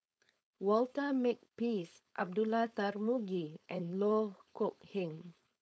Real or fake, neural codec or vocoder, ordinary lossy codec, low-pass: fake; codec, 16 kHz, 4.8 kbps, FACodec; none; none